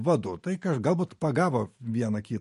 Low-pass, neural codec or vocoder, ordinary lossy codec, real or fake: 14.4 kHz; vocoder, 44.1 kHz, 128 mel bands every 512 samples, BigVGAN v2; MP3, 48 kbps; fake